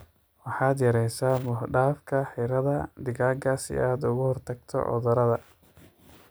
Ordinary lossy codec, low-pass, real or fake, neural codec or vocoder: none; none; real; none